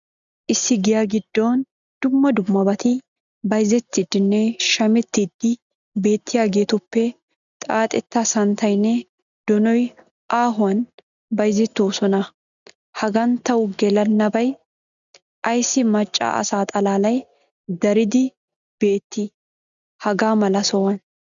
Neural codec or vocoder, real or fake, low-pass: none; real; 7.2 kHz